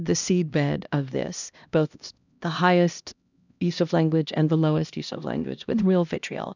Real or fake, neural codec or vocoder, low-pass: fake; codec, 16 kHz, 1 kbps, X-Codec, HuBERT features, trained on LibriSpeech; 7.2 kHz